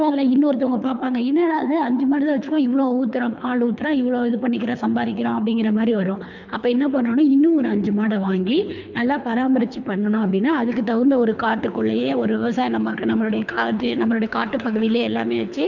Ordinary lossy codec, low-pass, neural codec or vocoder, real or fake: none; 7.2 kHz; codec, 24 kHz, 3 kbps, HILCodec; fake